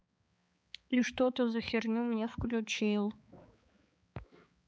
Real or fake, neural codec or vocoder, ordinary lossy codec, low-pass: fake; codec, 16 kHz, 4 kbps, X-Codec, HuBERT features, trained on balanced general audio; none; none